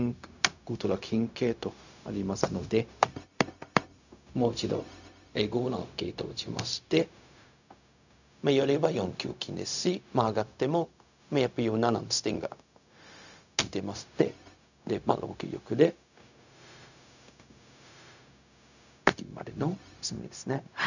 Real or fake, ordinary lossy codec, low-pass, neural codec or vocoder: fake; none; 7.2 kHz; codec, 16 kHz, 0.4 kbps, LongCat-Audio-Codec